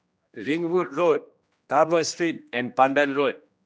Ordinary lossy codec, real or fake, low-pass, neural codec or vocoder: none; fake; none; codec, 16 kHz, 1 kbps, X-Codec, HuBERT features, trained on general audio